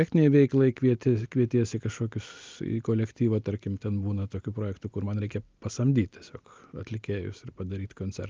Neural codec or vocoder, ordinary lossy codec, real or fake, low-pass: none; Opus, 24 kbps; real; 7.2 kHz